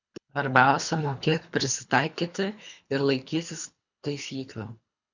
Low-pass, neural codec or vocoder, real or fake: 7.2 kHz; codec, 24 kHz, 3 kbps, HILCodec; fake